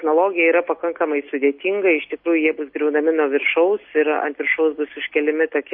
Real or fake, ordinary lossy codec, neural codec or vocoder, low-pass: real; MP3, 32 kbps; none; 5.4 kHz